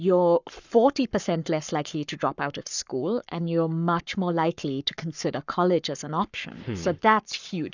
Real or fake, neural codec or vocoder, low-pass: fake; codec, 44.1 kHz, 7.8 kbps, Pupu-Codec; 7.2 kHz